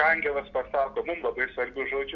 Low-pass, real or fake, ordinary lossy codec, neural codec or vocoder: 7.2 kHz; real; MP3, 48 kbps; none